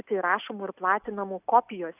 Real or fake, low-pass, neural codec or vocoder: real; 3.6 kHz; none